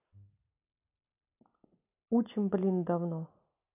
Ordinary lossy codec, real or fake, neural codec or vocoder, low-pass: none; real; none; 3.6 kHz